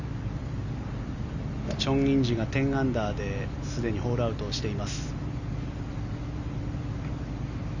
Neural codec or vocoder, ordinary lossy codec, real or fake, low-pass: none; none; real; 7.2 kHz